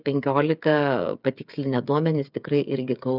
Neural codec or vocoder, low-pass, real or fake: codec, 16 kHz, 8 kbps, FreqCodec, smaller model; 5.4 kHz; fake